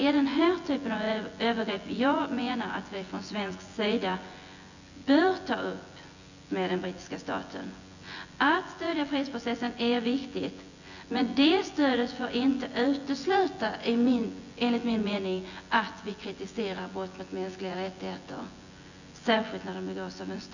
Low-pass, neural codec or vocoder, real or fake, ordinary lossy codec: 7.2 kHz; vocoder, 24 kHz, 100 mel bands, Vocos; fake; none